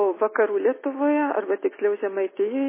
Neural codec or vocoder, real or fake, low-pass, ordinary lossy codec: none; real; 3.6 kHz; MP3, 16 kbps